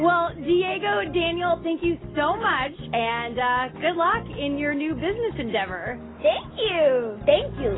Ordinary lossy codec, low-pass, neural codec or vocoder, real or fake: AAC, 16 kbps; 7.2 kHz; none; real